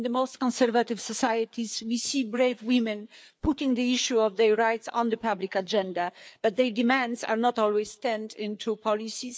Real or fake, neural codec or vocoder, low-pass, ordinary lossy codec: fake; codec, 16 kHz, 4 kbps, FreqCodec, larger model; none; none